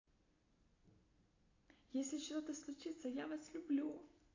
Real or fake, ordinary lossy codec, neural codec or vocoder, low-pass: real; AAC, 32 kbps; none; 7.2 kHz